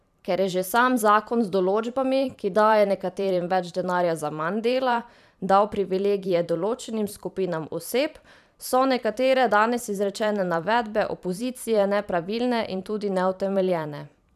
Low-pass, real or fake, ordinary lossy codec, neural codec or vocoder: 14.4 kHz; fake; none; vocoder, 44.1 kHz, 128 mel bands every 512 samples, BigVGAN v2